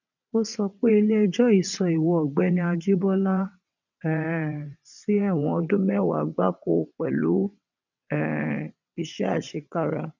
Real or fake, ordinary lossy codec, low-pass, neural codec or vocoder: fake; none; 7.2 kHz; vocoder, 22.05 kHz, 80 mel bands, WaveNeXt